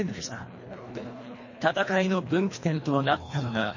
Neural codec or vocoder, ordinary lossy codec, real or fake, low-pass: codec, 24 kHz, 1.5 kbps, HILCodec; MP3, 32 kbps; fake; 7.2 kHz